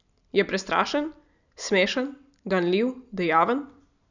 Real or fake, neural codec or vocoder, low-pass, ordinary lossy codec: real; none; 7.2 kHz; none